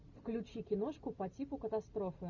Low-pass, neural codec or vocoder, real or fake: 7.2 kHz; none; real